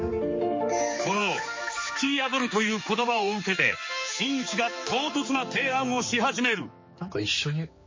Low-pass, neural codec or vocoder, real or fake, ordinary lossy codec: 7.2 kHz; codec, 16 kHz, 4 kbps, X-Codec, HuBERT features, trained on general audio; fake; MP3, 32 kbps